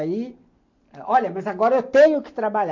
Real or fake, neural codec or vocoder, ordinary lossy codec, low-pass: real; none; MP3, 48 kbps; 7.2 kHz